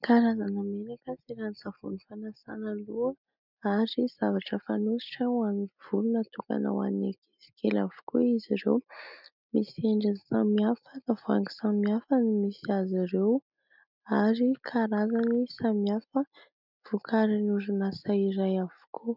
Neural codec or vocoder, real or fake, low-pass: none; real; 5.4 kHz